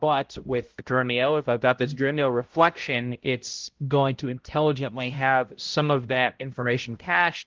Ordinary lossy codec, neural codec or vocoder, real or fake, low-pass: Opus, 16 kbps; codec, 16 kHz, 0.5 kbps, X-Codec, HuBERT features, trained on balanced general audio; fake; 7.2 kHz